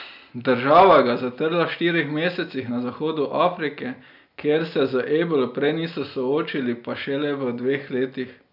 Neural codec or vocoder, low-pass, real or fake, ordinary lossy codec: none; 5.4 kHz; real; none